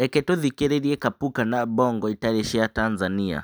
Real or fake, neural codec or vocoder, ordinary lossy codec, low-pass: real; none; none; none